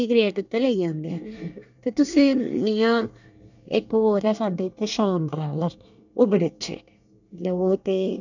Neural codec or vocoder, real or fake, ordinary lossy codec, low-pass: codec, 24 kHz, 1 kbps, SNAC; fake; none; 7.2 kHz